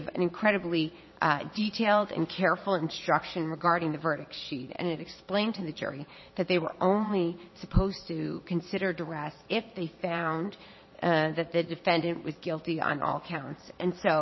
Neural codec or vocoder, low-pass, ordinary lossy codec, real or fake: vocoder, 22.05 kHz, 80 mel bands, Vocos; 7.2 kHz; MP3, 24 kbps; fake